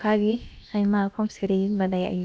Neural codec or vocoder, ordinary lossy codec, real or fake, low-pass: codec, 16 kHz, about 1 kbps, DyCAST, with the encoder's durations; none; fake; none